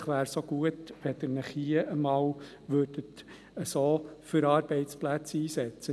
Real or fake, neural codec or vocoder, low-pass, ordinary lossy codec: real; none; none; none